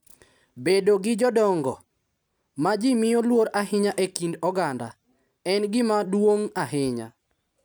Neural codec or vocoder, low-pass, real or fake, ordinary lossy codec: none; none; real; none